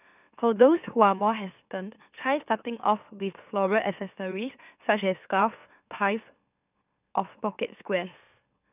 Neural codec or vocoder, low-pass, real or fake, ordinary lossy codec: autoencoder, 44.1 kHz, a latent of 192 numbers a frame, MeloTTS; 3.6 kHz; fake; none